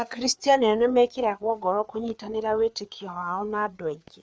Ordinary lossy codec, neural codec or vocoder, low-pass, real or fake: none; codec, 16 kHz, 4 kbps, FreqCodec, larger model; none; fake